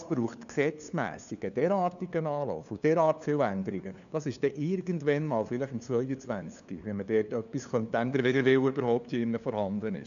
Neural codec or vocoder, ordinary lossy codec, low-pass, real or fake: codec, 16 kHz, 2 kbps, FunCodec, trained on LibriTTS, 25 frames a second; none; 7.2 kHz; fake